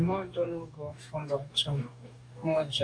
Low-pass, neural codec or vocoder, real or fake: 9.9 kHz; codec, 44.1 kHz, 2.6 kbps, DAC; fake